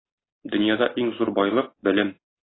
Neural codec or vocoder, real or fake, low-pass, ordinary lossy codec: none; real; 7.2 kHz; AAC, 16 kbps